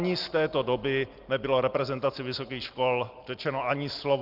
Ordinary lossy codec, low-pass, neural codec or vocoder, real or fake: Opus, 32 kbps; 5.4 kHz; none; real